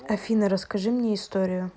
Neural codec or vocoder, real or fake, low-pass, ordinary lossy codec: none; real; none; none